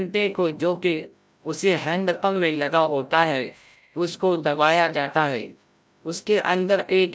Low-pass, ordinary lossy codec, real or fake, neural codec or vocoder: none; none; fake; codec, 16 kHz, 0.5 kbps, FreqCodec, larger model